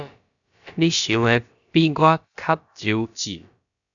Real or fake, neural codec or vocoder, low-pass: fake; codec, 16 kHz, about 1 kbps, DyCAST, with the encoder's durations; 7.2 kHz